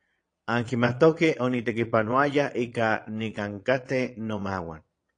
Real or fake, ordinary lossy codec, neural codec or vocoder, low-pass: fake; AAC, 48 kbps; vocoder, 22.05 kHz, 80 mel bands, Vocos; 9.9 kHz